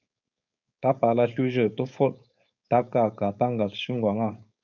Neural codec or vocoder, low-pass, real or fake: codec, 16 kHz, 4.8 kbps, FACodec; 7.2 kHz; fake